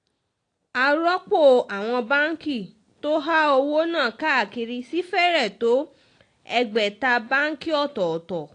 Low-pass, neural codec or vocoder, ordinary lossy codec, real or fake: 9.9 kHz; none; AAC, 48 kbps; real